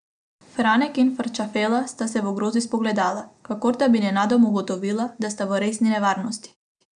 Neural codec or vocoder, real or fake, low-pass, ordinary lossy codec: none; real; 9.9 kHz; none